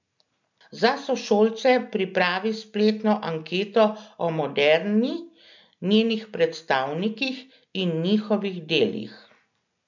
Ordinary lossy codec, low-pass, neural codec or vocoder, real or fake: none; 7.2 kHz; none; real